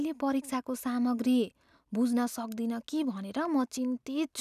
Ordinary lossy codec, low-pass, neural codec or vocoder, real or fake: none; 14.4 kHz; none; real